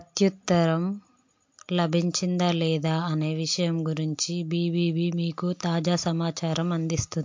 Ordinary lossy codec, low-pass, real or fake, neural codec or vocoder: MP3, 48 kbps; 7.2 kHz; real; none